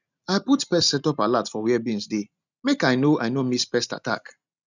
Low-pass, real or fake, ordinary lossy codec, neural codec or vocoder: 7.2 kHz; real; none; none